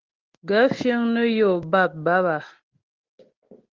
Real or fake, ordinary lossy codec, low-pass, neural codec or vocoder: real; Opus, 16 kbps; 7.2 kHz; none